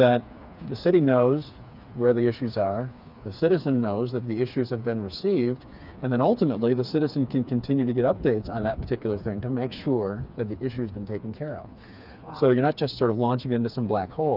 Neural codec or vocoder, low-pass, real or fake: codec, 16 kHz, 4 kbps, FreqCodec, smaller model; 5.4 kHz; fake